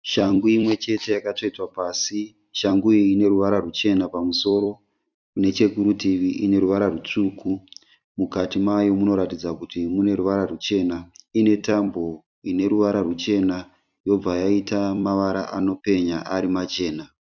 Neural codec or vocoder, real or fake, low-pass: vocoder, 44.1 kHz, 128 mel bands every 256 samples, BigVGAN v2; fake; 7.2 kHz